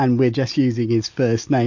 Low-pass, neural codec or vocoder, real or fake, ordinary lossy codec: 7.2 kHz; none; real; MP3, 48 kbps